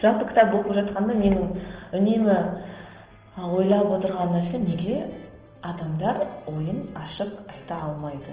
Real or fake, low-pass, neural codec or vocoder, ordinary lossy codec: real; 3.6 kHz; none; Opus, 16 kbps